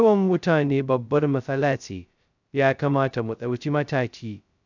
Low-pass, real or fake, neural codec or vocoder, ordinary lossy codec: 7.2 kHz; fake; codec, 16 kHz, 0.2 kbps, FocalCodec; none